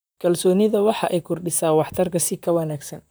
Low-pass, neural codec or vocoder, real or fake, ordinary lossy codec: none; vocoder, 44.1 kHz, 128 mel bands, Pupu-Vocoder; fake; none